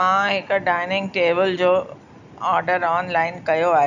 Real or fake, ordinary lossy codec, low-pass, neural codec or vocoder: real; none; 7.2 kHz; none